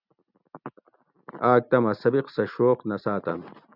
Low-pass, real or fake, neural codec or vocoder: 5.4 kHz; real; none